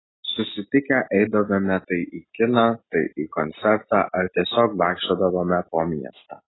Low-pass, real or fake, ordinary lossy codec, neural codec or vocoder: 7.2 kHz; real; AAC, 16 kbps; none